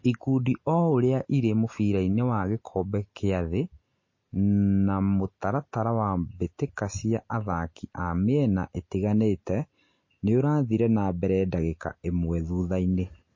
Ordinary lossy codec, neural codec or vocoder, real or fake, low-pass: MP3, 32 kbps; none; real; 7.2 kHz